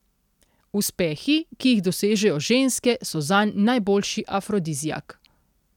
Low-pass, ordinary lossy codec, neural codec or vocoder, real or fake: 19.8 kHz; none; none; real